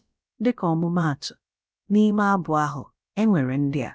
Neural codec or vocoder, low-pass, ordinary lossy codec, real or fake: codec, 16 kHz, about 1 kbps, DyCAST, with the encoder's durations; none; none; fake